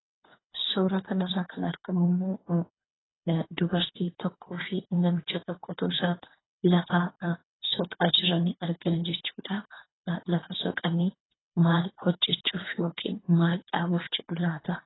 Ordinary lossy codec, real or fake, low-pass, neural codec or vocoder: AAC, 16 kbps; fake; 7.2 kHz; codec, 24 kHz, 3 kbps, HILCodec